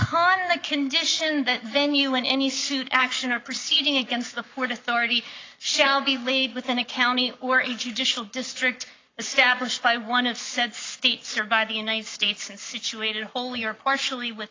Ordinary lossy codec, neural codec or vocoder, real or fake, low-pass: AAC, 32 kbps; codec, 44.1 kHz, 7.8 kbps, Pupu-Codec; fake; 7.2 kHz